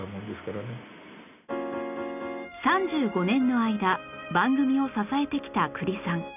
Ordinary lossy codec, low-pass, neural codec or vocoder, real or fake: none; 3.6 kHz; none; real